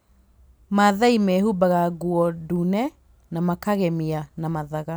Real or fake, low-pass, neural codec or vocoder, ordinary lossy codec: real; none; none; none